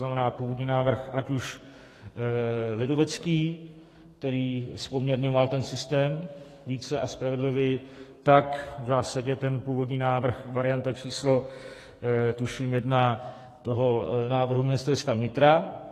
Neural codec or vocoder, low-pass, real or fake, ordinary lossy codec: codec, 44.1 kHz, 2.6 kbps, SNAC; 14.4 kHz; fake; AAC, 48 kbps